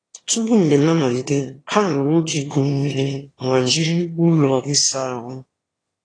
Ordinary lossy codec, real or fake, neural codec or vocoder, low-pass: AAC, 32 kbps; fake; autoencoder, 22.05 kHz, a latent of 192 numbers a frame, VITS, trained on one speaker; 9.9 kHz